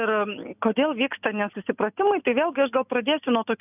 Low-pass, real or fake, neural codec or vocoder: 3.6 kHz; real; none